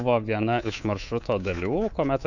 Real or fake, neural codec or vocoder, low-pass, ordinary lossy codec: fake; codec, 24 kHz, 3.1 kbps, DualCodec; 7.2 kHz; Opus, 64 kbps